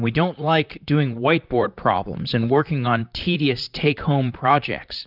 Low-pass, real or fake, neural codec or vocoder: 5.4 kHz; fake; vocoder, 44.1 kHz, 128 mel bands, Pupu-Vocoder